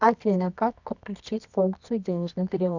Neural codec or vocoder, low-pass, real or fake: codec, 24 kHz, 0.9 kbps, WavTokenizer, medium music audio release; 7.2 kHz; fake